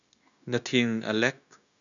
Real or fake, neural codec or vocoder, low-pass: fake; codec, 16 kHz, 0.9 kbps, LongCat-Audio-Codec; 7.2 kHz